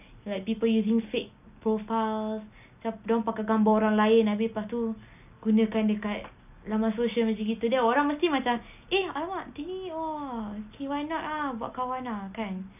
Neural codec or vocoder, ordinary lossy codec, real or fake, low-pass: none; none; real; 3.6 kHz